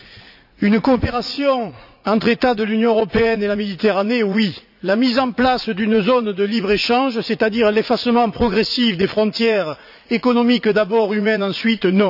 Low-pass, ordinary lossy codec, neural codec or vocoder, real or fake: 5.4 kHz; none; vocoder, 44.1 kHz, 80 mel bands, Vocos; fake